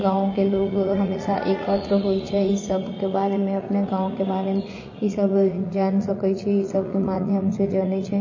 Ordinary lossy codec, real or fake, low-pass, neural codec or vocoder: MP3, 32 kbps; fake; 7.2 kHz; vocoder, 44.1 kHz, 80 mel bands, Vocos